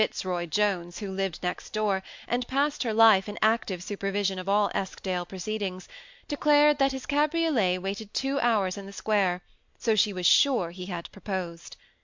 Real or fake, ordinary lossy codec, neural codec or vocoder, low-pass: real; MP3, 64 kbps; none; 7.2 kHz